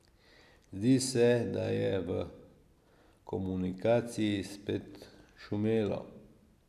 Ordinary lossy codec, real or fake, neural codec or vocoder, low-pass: none; real; none; 14.4 kHz